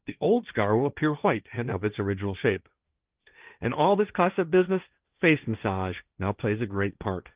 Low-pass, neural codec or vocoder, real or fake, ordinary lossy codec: 3.6 kHz; codec, 16 kHz, 1.1 kbps, Voila-Tokenizer; fake; Opus, 32 kbps